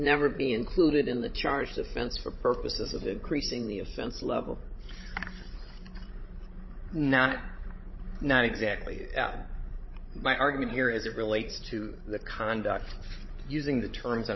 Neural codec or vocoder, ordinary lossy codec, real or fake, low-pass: codec, 16 kHz, 16 kbps, FreqCodec, larger model; MP3, 24 kbps; fake; 7.2 kHz